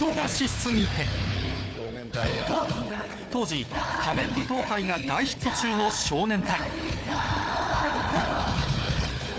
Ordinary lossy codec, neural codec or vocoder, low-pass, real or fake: none; codec, 16 kHz, 4 kbps, FunCodec, trained on Chinese and English, 50 frames a second; none; fake